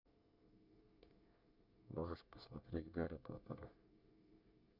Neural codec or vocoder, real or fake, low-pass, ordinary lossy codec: codec, 24 kHz, 1 kbps, SNAC; fake; 5.4 kHz; none